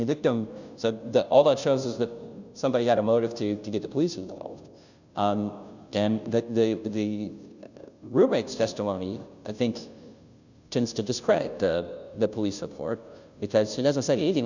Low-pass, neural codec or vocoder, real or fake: 7.2 kHz; codec, 16 kHz, 0.5 kbps, FunCodec, trained on Chinese and English, 25 frames a second; fake